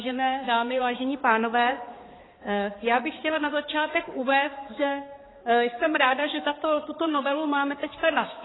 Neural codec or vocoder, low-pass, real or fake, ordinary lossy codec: codec, 16 kHz, 4 kbps, X-Codec, HuBERT features, trained on balanced general audio; 7.2 kHz; fake; AAC, 16 kbps